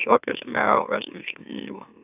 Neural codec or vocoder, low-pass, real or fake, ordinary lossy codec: autoencoder, 44.1 kHz, a latent of 192 numbers a frame, MeloTTS; 3.6 kHz; fake; none